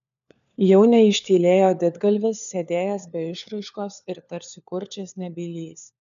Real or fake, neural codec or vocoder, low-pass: fake; codec, 16 kHz, 4 kbps, FunCodec, trained on LibriTTS, 50 frames a second; 7.2 kHz